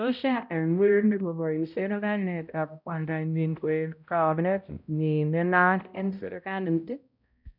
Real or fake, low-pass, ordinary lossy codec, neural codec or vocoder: fake; 5.4 kHz; none; codec, 16 kHz, 0.5 kbps, X-Codec, HuBERT features, trained on balanced general audio